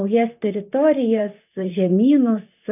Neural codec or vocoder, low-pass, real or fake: vocoder, 44.1 kHz, 128 mel bands, Pupu-Vocoder; 3.6 kHz; fake